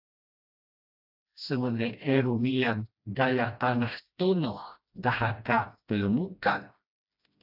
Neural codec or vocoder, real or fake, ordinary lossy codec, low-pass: codec, 16 kHz, 1 kbps, FreqCodec, smaller model; fake; AAC, 48 kbps; 5.4 kHz